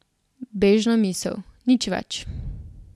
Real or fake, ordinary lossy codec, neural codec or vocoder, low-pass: real; none; none; none